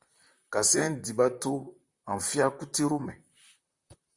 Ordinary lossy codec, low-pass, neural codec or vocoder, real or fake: Opus, 64 kbps; 10.8 kHz; vocoder, 44.1 kHz, 128 mel bands, Pupu-Vocoder; fake